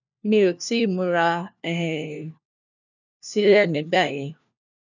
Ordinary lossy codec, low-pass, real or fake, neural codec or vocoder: none; 7.2 kHz; fake; codec, 16 kHz, 1 kbps, FunCodec, trained on LibriTTS, 50 frames a second